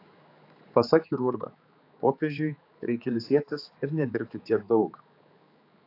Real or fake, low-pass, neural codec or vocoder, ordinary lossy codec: fake; 5.4 kHz; codec, 16 kHz, 4 kbps, X-Codec, HuBERT features, trained on general audio; AAC, 32 kbps